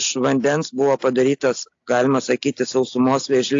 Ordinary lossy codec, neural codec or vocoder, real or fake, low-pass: AAC, 48 kbps; none; real; 7.2 kHz